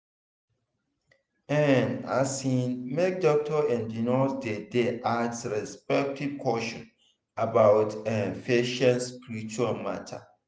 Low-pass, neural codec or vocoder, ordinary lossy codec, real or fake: none; none; none; real